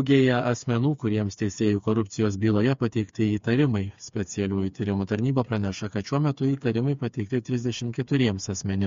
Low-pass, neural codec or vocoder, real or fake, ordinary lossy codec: 7.2 kHz; codec, 16 kHz, 4 kbps, FreqCodec, smaller model; fake; MP3, 48 kbps